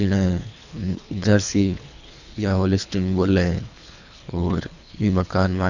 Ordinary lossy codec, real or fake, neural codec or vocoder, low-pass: none; fake; codec, 24 kHz, 3 kbps, HILCodec; 7.2 kHz